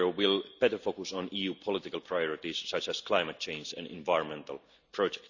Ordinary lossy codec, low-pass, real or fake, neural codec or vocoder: none; 7.2 kHz; real; none